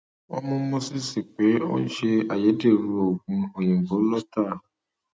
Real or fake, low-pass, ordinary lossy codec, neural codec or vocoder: real; none; none; none